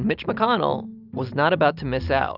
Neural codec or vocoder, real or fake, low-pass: none; real; 5.4 kHz